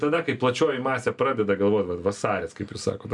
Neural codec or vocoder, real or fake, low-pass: none; real; 10.8 kHz